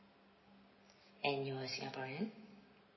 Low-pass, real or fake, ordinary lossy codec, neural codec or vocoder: 7.2 kHz; real; MP3, 24 kbps; none